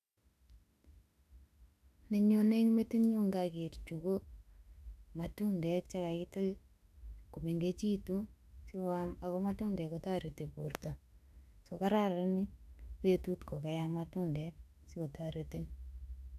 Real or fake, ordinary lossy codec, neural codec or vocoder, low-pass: fake; none; autoencoder, 48 kHz, 32 numbers a frame, DAC-VAE, trained on Japanese speech; 14.4 kHz